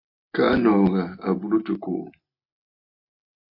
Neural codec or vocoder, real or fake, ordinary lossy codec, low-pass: none; real; MP3, 32 kbps; 5.4 kHz